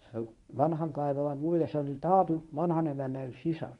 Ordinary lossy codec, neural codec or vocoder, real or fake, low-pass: MP3, 64 kbps; codec, 24 kHz, 0.9 kbps, WavTokenizer, medium speech release version 1; fake; 10.8 kHz